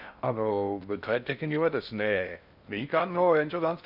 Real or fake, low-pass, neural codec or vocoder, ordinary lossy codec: fake; 5.4 kHz; codec, 16 kHz in and 24 kHz out, 0.6 kbps, FocalCodec, streaming, 2048 codes; none